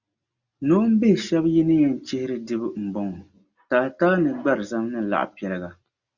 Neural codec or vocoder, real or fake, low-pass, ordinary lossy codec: none; real; 7.2 kHz; Opus, 64 kbps